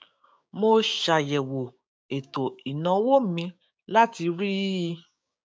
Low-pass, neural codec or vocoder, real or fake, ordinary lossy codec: none; codec, 16 kHz, 6 kbps, DAC; fake; none